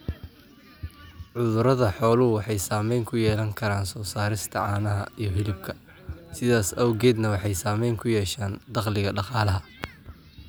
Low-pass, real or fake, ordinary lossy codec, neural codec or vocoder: none; real; none; none